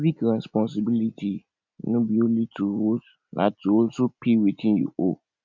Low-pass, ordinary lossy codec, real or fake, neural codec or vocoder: 7.2 kHz; none; real; none